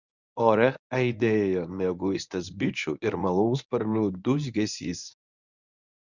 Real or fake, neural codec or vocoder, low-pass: fake; codec, 24 kHz, 0.9 kbps, WavTokenizer, medium speech release version 1; 7.2 kHz